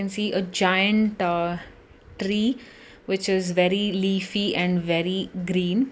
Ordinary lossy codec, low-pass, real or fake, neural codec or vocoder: none; none; real; none